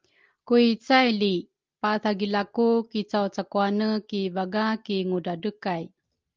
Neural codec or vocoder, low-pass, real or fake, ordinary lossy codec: none; 7.2 kHz; real; Opus, 24 kbps